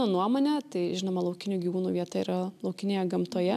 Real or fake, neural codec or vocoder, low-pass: real; none; 14.4 kHz